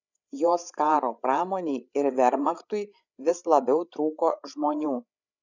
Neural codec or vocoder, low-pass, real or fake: codec, 16 kHz, 8 kbps, FreqCodec, larger model; 7.2 kHz; fake